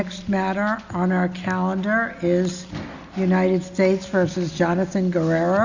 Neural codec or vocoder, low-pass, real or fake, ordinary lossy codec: none; 7.2 kHz; real; Opus, 64 kbps